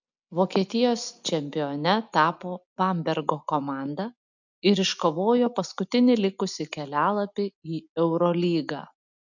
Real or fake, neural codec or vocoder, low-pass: real; none; 7.2 kHz